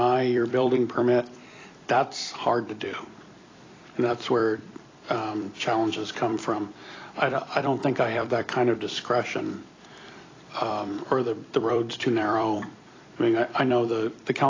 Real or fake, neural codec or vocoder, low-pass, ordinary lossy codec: fake; vocoder, 44.1 kHz, 128 mel bands every 256 samples, BigVGAN v2; 7.2 kHz; AAC, 32 kbps